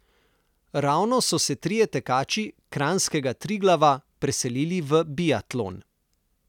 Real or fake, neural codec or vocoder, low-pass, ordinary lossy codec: real; none; 19.8 kHz; none